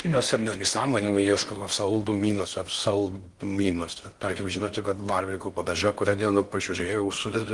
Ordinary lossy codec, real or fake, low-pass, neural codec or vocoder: Opus, 24 kbps; fake; 10.8 kHz; codec, 16 kHz in and 24 kHz out, 0.6 kbps, FocalCodec, streaming, 4096 codes